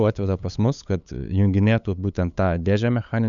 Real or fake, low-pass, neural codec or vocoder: fake; 7.2 kHz; codec, 16 kHz, 8 kbps, FunCodec, trained on LibriTTS, 25 frames a second